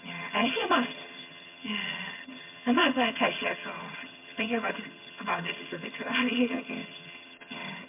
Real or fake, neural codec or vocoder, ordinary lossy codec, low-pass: fake; vocoder, 22.05 kHz, 80 mel bands, HiFi-GAN; MP3, 32 kbps; 3.6 kHz